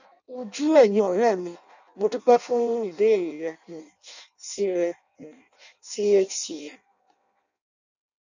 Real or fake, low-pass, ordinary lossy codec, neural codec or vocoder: fake; 7.2 kHz; none; codec, 16 kHz in and 24 kHz out, 0.6 kbps, FireRedTTS-2 codec